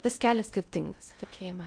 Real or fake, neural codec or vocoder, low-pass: fake; codec, 16 kHz in and 24 kHz out, 0.6 kbps, FocalCodec, streaming, 4096 codes; 9.9 kHz